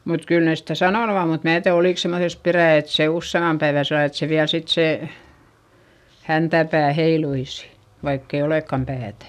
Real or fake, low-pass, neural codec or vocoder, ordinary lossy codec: real; 14.4 kHz; none; none